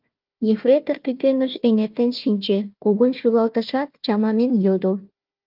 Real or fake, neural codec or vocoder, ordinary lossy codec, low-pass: fake; codec, 16 kHz, 1 kbps, FunCodec, trained on Chinese and English, 50 frames a second; Opus, 24 kbps; 5.4 kHz